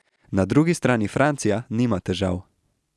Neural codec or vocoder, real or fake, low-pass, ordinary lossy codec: none; real; none; none